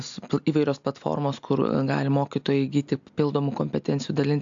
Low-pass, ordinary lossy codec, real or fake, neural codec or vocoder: 7.2 kHz; AAC, 64 kbps; real; none